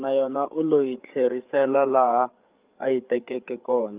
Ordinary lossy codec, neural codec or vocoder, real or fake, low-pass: Opus, 24 kbps; codec, 16 kHz, 8 kbps, FreqCodec, larger model; fake; 3.6 kHz